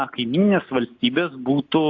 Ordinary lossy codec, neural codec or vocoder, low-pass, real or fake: AAC, 48 kbps; none; 7.2 kHz; real